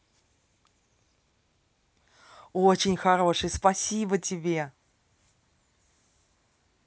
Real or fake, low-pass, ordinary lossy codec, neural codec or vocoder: real; none; none; none